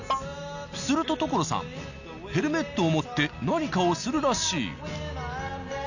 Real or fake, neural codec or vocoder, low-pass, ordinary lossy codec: real; none; 7.2 kHz; none